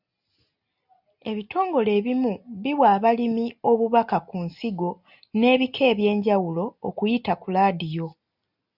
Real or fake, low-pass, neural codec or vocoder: real; 5.4 kHz; none